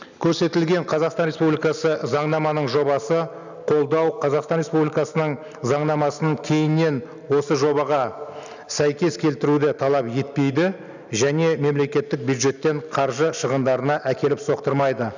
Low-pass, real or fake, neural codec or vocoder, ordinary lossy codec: 7.2 kHz; real; none; none